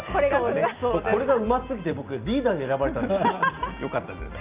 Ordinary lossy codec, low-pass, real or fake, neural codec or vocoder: Opus, 24 kbps; 3.6 kHz; real; none